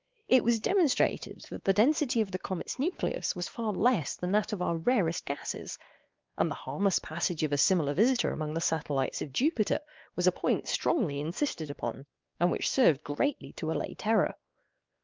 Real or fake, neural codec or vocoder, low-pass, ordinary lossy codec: fake; codec, 16 kHz, 2 kbps, X-Codec, WavLM features, trained on Multilingual LibriSpeech; 7.2 kHz; Opus, 32 kbps